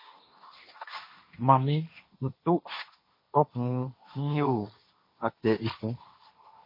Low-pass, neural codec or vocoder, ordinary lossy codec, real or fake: 5.4 kHz; codec, 16 kHz, 1.1 kbps, Voila-Tokenizer; MP3, 24 kbps; fake